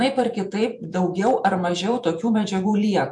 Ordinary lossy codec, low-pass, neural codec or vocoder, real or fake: MP3, 64 kbps; 10.8 kHz; vocoder, 48 kHz, 128 mel bands, Vocos; fake